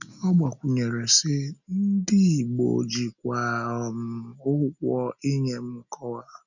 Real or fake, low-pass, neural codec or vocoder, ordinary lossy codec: real; 7.2 kHz; none; none